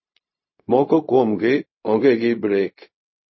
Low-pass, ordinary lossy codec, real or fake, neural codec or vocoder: 7.2 kHz; MP3, 24 kbps; fake; codec, 16 kHz, 0.4 kbps, LongCat-Audio-Codec